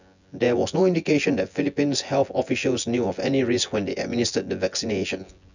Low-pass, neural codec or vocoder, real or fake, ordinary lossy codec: 7.2 kHz; vocoder, 24 kHz, 100 mel bands, Vocos; fake; none